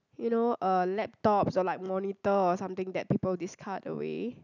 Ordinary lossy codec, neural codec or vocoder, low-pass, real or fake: none; none; 7.2 kHz; real